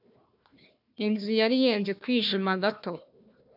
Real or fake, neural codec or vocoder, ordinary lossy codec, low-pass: fake; codec, 16 kHz, 1 kbps, FunCodec, trained on Chinese and English, 50 frames a second; AAC, 48 kbps; 5.4 kHz